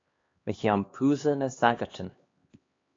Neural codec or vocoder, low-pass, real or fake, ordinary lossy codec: codec, 16 kHz, 2 kbps, X-Codec, HuBERT features, trained on LibriSpeech; 7.2 kHz; fake; AAC, 32 kbps